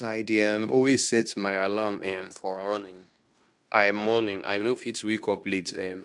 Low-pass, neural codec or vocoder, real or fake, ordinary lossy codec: 10.8 kHz; codec, 16 kHz in and 24 kHz out, 0.9 kbps, LongCat-Audio-Codec, fine tuned four codebook decoder; fake; none